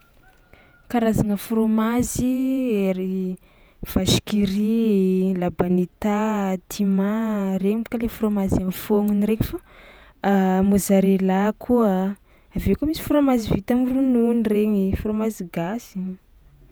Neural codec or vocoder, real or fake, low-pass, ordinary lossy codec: vocoder, 48 kHz, 128 mel bands, Vocos; fake; none; none